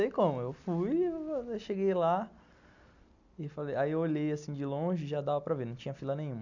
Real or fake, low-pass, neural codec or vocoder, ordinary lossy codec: real; 7.2 kHz; none; none